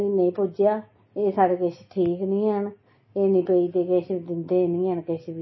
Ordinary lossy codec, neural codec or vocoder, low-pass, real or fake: MP3, 24 kbps; none; 7.2 kHz; real